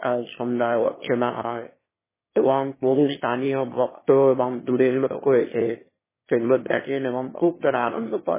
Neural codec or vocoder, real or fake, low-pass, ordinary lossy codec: autoencoder, 22.05 kHz, a latent of 192 numbers a frame, VITS, trained on one speaker; fake; 3.6 kHz; MP3, 16 kbps